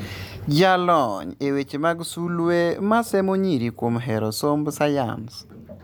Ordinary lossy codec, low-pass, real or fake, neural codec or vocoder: none; none; real; none